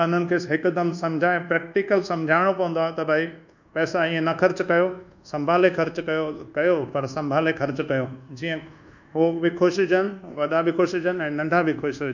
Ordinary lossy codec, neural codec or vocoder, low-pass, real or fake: none; codec, 24 kHz, 1.2 kbps, DualCodec; 7.2 kHz; fake